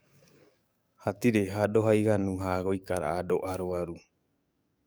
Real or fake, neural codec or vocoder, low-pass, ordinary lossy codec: fake; codec, 44.1 kHz, 7.8 kbps, DAC; none; none